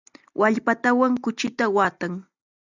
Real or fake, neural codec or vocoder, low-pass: real; none; 7.2 kHz